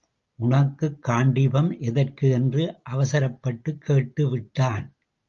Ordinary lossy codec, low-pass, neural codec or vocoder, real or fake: Opus, 32 kbps; 7.2 kHz; none; real